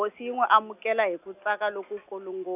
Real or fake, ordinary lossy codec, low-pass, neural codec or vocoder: real; none; 3.6 kHz; none